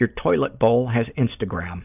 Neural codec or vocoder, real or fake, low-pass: none; real; 3.6 kHz